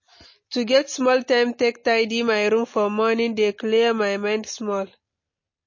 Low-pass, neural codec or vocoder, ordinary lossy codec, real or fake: 7.2 kHz; none; MP3, 32 kbps; real